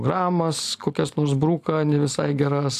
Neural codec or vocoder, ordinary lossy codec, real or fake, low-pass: none; AAC, 64 kbps; real; 14.4 kHz